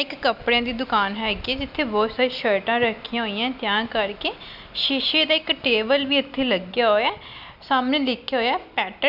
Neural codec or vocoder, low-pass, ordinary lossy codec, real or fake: none; 5.4 kHz; none; real